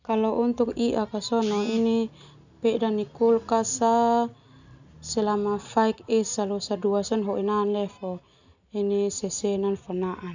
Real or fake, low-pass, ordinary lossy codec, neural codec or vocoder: real; 7.2 kHz; none; none